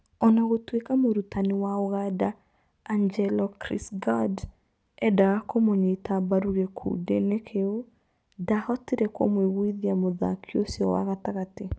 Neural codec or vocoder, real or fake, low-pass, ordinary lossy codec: none; real; none; none